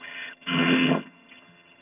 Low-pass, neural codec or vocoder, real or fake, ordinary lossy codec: 3.6 kHz; vocoder, 22.05 kHz, 80 mel bands, HiFi-GAN; fake; none